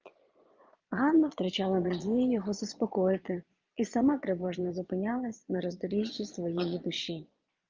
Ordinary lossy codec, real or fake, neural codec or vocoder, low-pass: Opus, 24 kbps; fake; vocoder, 22.05 kHz, 80 mel bands, Vocos; 7.2 kHz